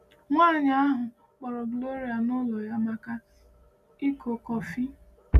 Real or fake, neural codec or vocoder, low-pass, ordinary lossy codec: real; none; 14.4 kHz; none